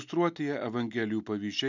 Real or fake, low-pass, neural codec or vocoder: real; 7.2 kHz; none